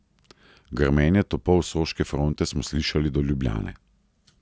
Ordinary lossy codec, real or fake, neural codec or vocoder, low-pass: none; real; none; none